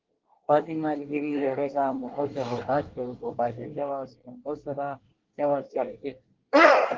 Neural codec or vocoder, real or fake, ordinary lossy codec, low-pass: codec, 24 kHz, 1 kbps, SNAC; fake; Opus, 16 kbps; 7.2 kHz